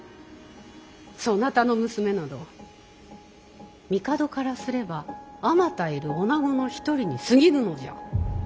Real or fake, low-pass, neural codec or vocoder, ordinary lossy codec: real; none; none; none